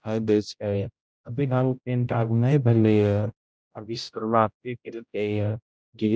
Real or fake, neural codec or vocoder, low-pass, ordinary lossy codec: fake; codec, 16 kHz, 0.5 kbps, X-Codec, HuBERT features, trained on general audio; none; none